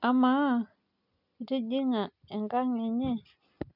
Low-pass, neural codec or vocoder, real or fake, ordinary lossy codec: 5.4 kHz; none; real; none